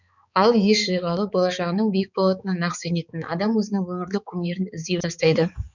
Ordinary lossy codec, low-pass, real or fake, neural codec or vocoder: none; 7.2 kHz; fake; codec, 16 kHz, 4 kbps, X-Codec, HuBERT features, trained on balanced general audio